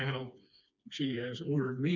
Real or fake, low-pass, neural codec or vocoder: fake; 7.2 kHz; codec, 16 kHz, 2 kbps, FreqCodec, smaller model